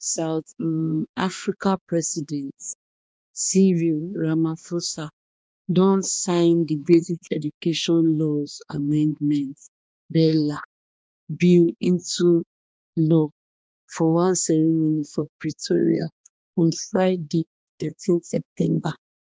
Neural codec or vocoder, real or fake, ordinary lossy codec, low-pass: codec, 16 kHz, 2 kbps, X-Codec, HuBERT features, trained on balanced general audio; fake; none; none